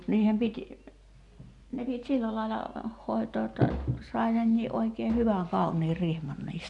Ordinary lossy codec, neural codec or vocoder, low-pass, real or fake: none; none; 10.8 kHz; real